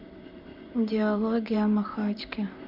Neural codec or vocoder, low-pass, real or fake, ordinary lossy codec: vocoder, 44.1 kHz, 128 mel bands, Pupu-Vocoder; 5.4 kHz; fake; MP3, 48 kbps